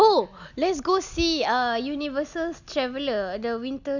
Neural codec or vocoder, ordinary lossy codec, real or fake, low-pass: none; none; real; 7.2 kHz